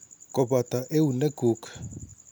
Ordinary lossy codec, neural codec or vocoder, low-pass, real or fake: none; none; none; real